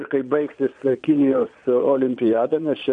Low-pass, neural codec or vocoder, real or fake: 9.9 kHz; vocoder, 22.05 kHz, 80 mel bands, Vocos; fake